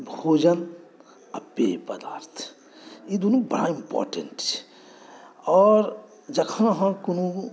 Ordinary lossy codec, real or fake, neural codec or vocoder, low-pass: none; real; none; none